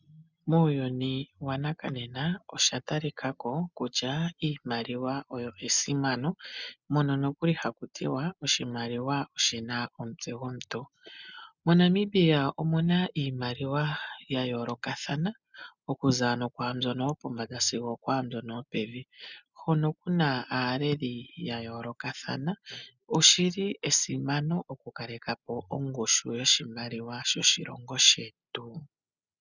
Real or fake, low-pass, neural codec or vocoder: real; 7.2 kHz; none